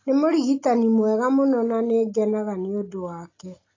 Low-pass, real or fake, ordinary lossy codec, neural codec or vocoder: 7.2 kHz; real; AAC, 32 kbps; none